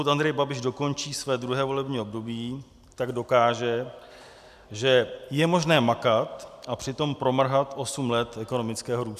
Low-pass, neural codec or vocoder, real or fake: 14.4 kHz; none; real